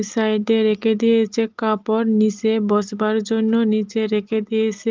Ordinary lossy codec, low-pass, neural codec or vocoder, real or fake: Opus, 32 kbps; 7.2 kHz; none; real